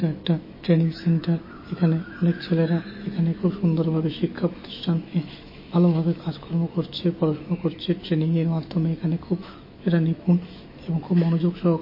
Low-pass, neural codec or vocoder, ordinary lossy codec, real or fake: 5.4 kHz; autoencoder, 48 kHz, 128 numbers a frame, DAC-VAE, trained on Japanese speech; MP3, 24 kbps; fake